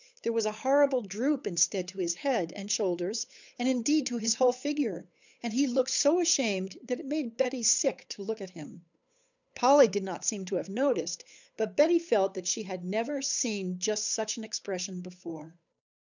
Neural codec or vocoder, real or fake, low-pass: codec, 16 kHz, 8 kbps, FunCodec, trained on Chinese and English, 25 frames a second; fake; 7.2 kHz